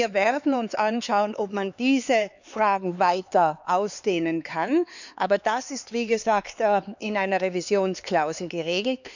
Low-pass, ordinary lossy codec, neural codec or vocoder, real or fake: 7.2 kHz; none; codec, 16 kHz, 4 kbps, X-Codec, HuBERT features, trained on LibriSpeech; fake